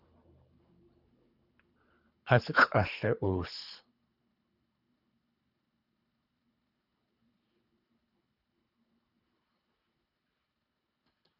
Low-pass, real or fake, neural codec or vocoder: 5.4 kHz; fake; codec, 24 kHz, 3 kbps, HILCodec